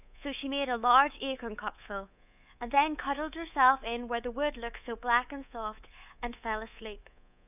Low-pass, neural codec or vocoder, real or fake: 3.6 kHz; codec, 24 kHz, 3.1 kbps, DualCodec; fake